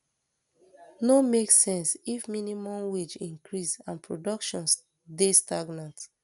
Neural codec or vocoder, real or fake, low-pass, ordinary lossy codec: none; real; 10.8 kHz; none